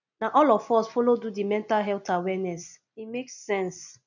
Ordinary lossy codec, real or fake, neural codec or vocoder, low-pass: none; real; none; 7.2 kHz